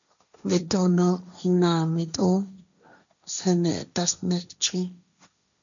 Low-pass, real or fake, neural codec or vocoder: 7.2 kHz; fake; codec, 16 kHz, 1.1 kbps, Voila-Tokenizer